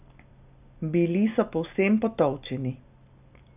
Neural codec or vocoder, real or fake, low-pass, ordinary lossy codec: none; real; 3.6 kHz; none